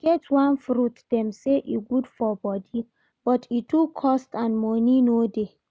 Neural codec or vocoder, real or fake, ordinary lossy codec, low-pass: none; real; none; none